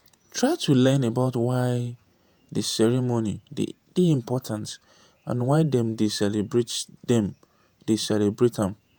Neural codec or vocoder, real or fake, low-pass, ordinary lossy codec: vocoder, 48 kHz, 128 mel bands, Vocos; fake; none; none